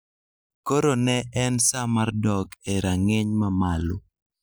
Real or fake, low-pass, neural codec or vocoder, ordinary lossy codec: real; none; none; none